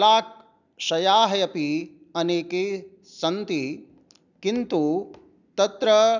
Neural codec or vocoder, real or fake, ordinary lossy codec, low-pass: none; real; none; 7.2 kHz